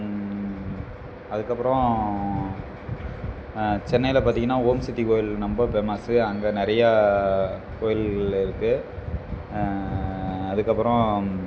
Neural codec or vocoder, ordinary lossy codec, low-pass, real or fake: none; none; none; real